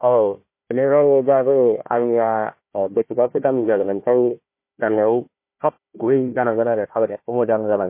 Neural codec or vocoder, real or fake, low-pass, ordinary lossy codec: codec, 16 kHz, 1 kbps, FunCodec, trained on Chinese and English, 50 frames a second; fake; 3.6 kHz; MP3, 24 kbps